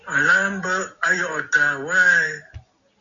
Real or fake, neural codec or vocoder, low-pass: real; none; 7.2 kHz